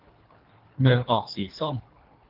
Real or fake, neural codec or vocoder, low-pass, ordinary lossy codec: fake; codec, 24 kHz, 3 kbps, HILCodec; 5.4 kHz; Opus, 24 kbps